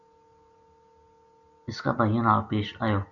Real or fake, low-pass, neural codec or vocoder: real; 7.2 kHz; none